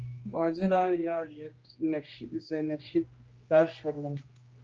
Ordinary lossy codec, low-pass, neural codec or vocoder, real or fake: Opus, 24 kbps; 7.2 kHz; codec, 16 kHz, 1 kbps, X-Codec, HuBERT features, trained on general audio; fake